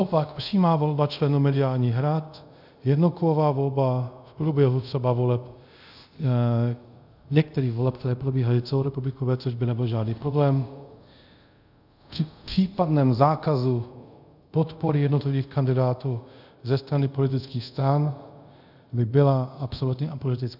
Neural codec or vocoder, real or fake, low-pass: codec, 24 kHz, 0.5 kbps, DualCodec; fake; 5.4 kHz